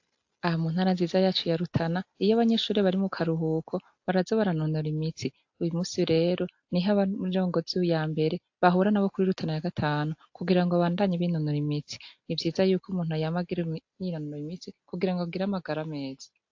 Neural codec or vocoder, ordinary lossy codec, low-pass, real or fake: none; AAC, 48 kbps; 7.2 kHz; real